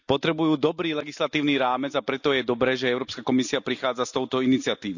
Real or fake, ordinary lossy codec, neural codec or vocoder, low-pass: real; none; none; 7.2 kHz